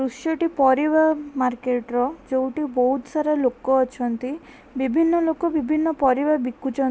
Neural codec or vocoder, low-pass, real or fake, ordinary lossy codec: none; none; real; none